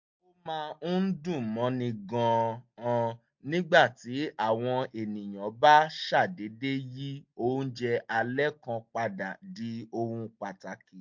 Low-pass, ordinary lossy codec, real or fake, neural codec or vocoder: 7.2 kHz; MP3, 48 kbps; real; none